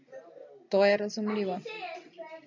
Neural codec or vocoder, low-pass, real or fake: none; 7.2 kHz; real